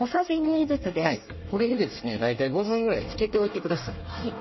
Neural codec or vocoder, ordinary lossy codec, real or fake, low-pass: codec, 24 kHz, 1 kbps, SNAC; MP3, 24 kbps; fake; 7.2 kHz